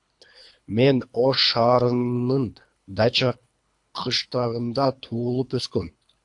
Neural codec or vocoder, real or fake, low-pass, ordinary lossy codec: codec, 24 kHz, 3 kbps, HILCodec; fake; 10.8 kHz; AAC, 64 kbps